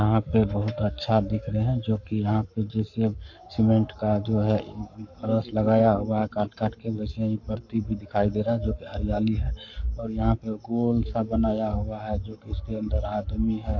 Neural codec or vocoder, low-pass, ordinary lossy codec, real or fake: codec, 44.1 kHz, 7.8 kbps, Pupu-Codec; 7.2 kHz; AAC, 48 kbps; fake